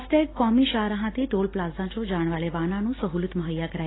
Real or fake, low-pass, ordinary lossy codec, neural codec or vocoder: real; 7.2 kHz; AAC, 16 kbps; none